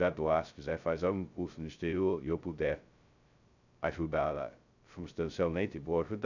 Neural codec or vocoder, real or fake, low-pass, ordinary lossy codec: codec, 16 kHz, 0.2 kbps, FocalCodec; fake; 7.2 kHz; none